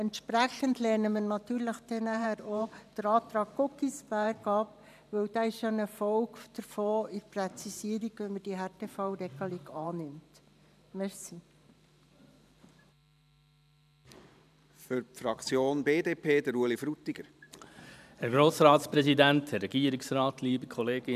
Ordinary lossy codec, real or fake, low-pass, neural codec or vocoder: none; fake; 14.4 kHz; vocoder, 44.1 kHz, 128 mel bands every 512 samples, BigVGAN v2